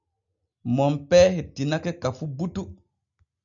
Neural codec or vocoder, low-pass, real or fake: none; 7.2 kHz; real